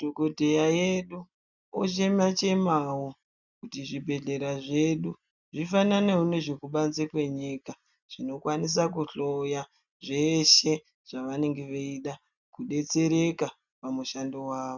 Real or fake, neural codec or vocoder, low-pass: real; none; 7.2 kHz